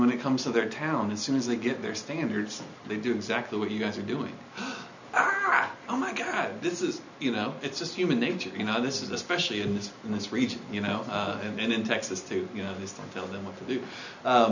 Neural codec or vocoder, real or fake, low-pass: none; real; 7.2 kHz